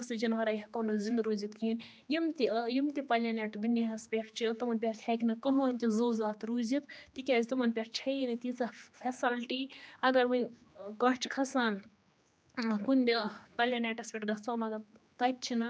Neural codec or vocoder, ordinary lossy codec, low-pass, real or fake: codec, 16 kHz, 2 kbps, X-Codec, HuBERT features, trained on general audio; none; none; fake